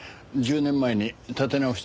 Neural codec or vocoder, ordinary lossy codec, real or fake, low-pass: none; none; real; none